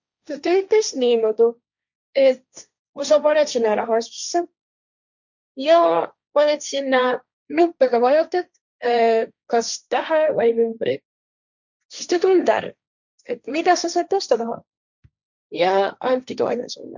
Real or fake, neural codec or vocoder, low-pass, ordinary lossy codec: fake; codec, 16 kHz, 1.1 kbps, Voila-Tokenizer; 7.2 kHz; none